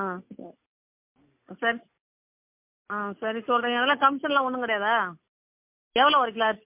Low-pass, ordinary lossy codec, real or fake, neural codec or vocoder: 3.6 kHz; MP3, 32 kbps; real; none